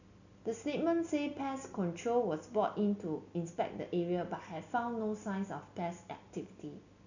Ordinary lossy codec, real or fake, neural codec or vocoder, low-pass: none; real; none; 7.2 kHz